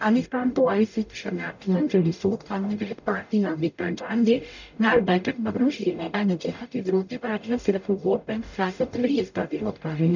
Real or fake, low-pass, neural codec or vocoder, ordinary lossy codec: fake; 7.2 kHz; codec, 44.1 kHz, 0.9 kbps, DAC; none